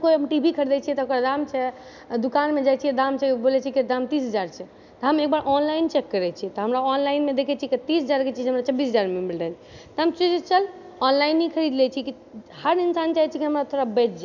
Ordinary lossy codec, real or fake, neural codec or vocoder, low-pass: none; real; none; 7.2 kHz